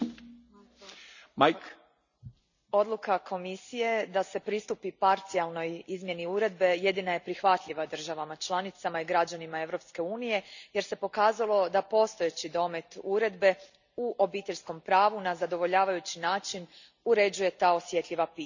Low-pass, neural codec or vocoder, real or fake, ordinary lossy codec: 7.2 kHz; none; real; none